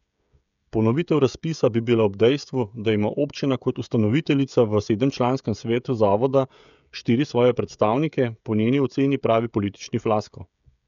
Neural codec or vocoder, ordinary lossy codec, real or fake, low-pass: codec, 16 kHz, 16 kbps, FreqCodec, smaller model; none; fake; 7.2 kHz